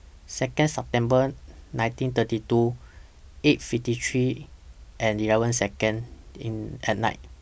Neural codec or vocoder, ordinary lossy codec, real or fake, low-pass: none; none; real; none